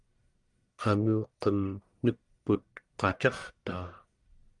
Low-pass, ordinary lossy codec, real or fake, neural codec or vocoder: 10.8 kHz; Opus, 32 kbps; fake; codec, 44.1 kHz, 1.7 kbps, Pupu-Codec